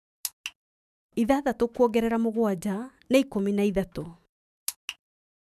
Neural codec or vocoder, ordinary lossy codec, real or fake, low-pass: autoencoder, 48 kHz, 128 numbers a frame, DAC-VAE, trained on Japanese speech; none; fake; 14.4 kHz